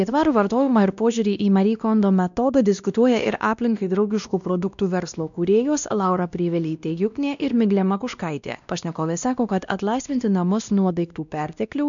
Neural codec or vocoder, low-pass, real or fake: codec, 16 kHz, 1 kbps, X-Codec, WavLM features, trained on Multilingual LibriSpeech; 7.2 kHz; fake